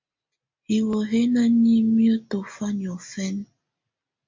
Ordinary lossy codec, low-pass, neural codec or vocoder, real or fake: MP3, 48 kbps; 7.2 kHz; none; real